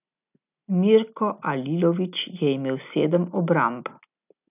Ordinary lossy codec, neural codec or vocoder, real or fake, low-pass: none; none; real; 3.6 kHz